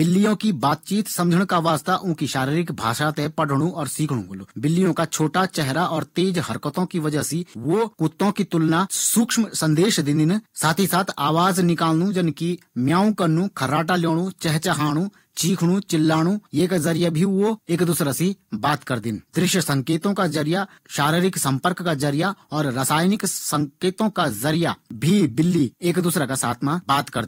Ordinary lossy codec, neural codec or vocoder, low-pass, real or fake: AAC, 48 kbps; vocoder, 44.1 kHz, 128 mel bands every 256 samples, BigVGAN v2; 19.8 kHz; fake